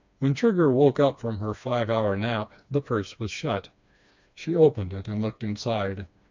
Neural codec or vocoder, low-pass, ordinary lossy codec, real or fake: codec, 16 kHz, 2 kbps, FreqCodec, smaller model; 7.2 kHz; MP3, 64 kbps; fake